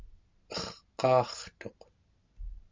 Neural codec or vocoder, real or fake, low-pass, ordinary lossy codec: none; real; 7.2 kHz; MP3, 64 kbps